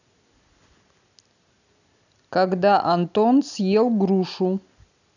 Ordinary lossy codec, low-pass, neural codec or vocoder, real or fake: none; 7.2 kHz; none; real